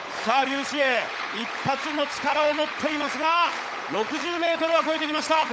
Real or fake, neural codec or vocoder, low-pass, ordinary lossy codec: fake; codec, 16 kHz, 16 kbps, FunCodec, trained on LibriTTS, 50 frames a second; none; none